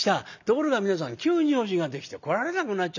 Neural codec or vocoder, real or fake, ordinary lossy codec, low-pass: none; real; none; 7.2 kHz